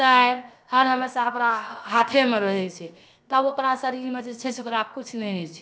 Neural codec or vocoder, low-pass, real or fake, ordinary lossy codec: codec, 16 kHz, 0.7 kbps, FocalCodec; none; fake; none